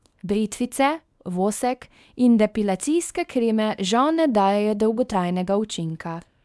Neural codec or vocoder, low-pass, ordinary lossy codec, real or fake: codec, 24 kHz, 0.9 kbps, WavTokenizer, small release; none; none; fake